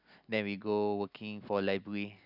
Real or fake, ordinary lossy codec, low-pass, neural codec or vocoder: real; none; 5.4 kHz; none